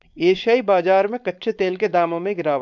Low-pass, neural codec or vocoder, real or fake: 7.2 kHz; codec, 16 kHz, 4.8 kbps, FACodec; fake